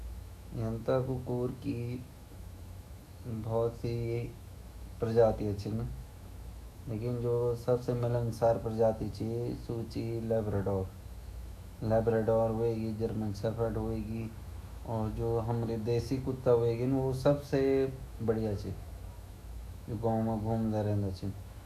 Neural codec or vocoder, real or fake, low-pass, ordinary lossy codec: autoencoder, 48 kHz, 128 numbers a frame, DAC-VAE, trained on Japanese speech; fake; 14.4 kHz; none